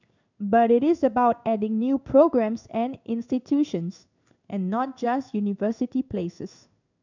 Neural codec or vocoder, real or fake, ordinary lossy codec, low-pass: codec, 16 kHz in and 24 kHz out, 1 kbps, XY-Tokenizer; fake; none; 7.2 kHz